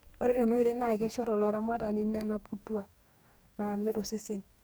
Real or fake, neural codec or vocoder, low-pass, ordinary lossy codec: fake; codec, 44.1 kHz, 2.6 kbps, DAC; none; none